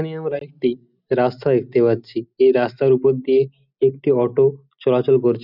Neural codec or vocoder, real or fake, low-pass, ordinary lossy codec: none; real; 5.4 kHz; none